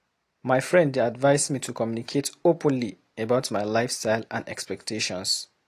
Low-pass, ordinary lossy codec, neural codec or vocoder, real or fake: 14.4 kHz; AAC, 48 kbps; none; real